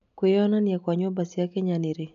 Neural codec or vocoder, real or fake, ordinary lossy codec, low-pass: none; real; none; 7.2 kHz